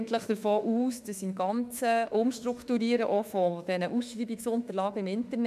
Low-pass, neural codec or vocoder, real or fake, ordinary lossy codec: 14.4 kHz; autoencoder, 48 kHz, 32 numbers a frame, DAC-VAE, trained on Japanese speech; fake; none